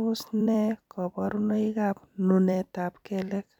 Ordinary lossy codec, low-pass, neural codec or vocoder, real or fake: none; 19.8 kHz; vocoder, 44.1 kHz, 128 mel bands every 256 samples, BigVGAN v2; fake